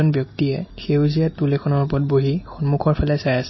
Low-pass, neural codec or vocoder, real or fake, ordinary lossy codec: 7.2 kHz; none; real; MP3, 24 kbps